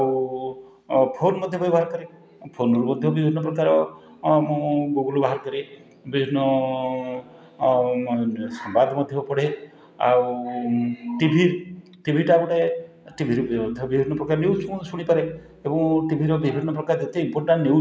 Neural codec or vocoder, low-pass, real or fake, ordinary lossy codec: none; none; real; none